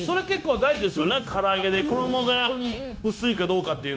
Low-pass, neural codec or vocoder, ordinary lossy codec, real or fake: none; codec, 16 kHz, 0.9 kbps, LongCat-Audio-Codec; none; fake